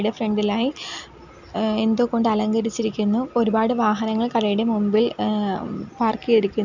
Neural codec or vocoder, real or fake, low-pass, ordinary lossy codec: none; real; 7.2 kHz; none